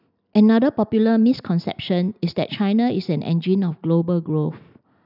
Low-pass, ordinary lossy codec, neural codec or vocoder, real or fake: 5.4 kHz; none; none; real